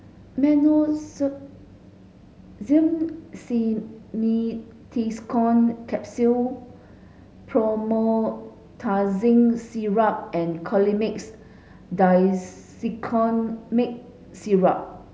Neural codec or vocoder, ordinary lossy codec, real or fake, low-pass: none; none; real; none